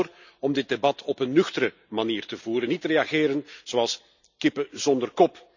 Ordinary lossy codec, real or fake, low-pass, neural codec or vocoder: none; real; 7.2 kHz; none